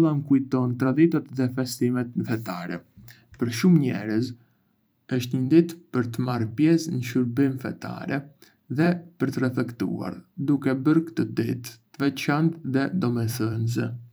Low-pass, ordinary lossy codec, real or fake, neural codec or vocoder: none; none; fake; vocoder, 44.1 kHz, 128 mel bands every 512 samples, BigVGAN v2